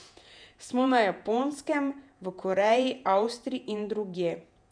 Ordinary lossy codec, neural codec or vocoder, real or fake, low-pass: none; vocoder, 48 kHz, 128 mel bands, Vocos; fake; 9.9 kHz